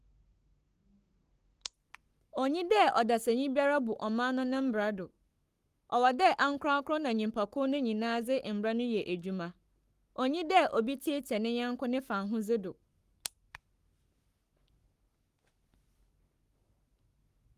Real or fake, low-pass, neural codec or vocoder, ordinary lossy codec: fake; 14.4 kHz; codec, 44.1 kHz, 7.8 kbps, Pupu-Codec; Opus, 24 kbps